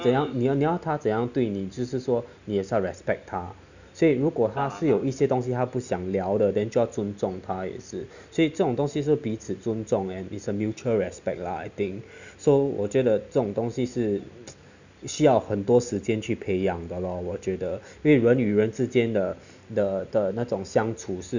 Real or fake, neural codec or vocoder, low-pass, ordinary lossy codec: real; none; 7.2 kHz; none